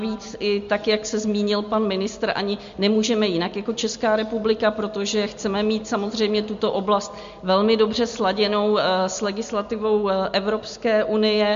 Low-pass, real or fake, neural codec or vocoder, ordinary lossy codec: 7.2 kHz; real; none; MP3, 48 kbps